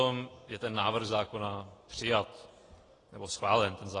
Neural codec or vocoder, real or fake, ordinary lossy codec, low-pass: none; real; AAC, 32 kbps; 10.8 kHz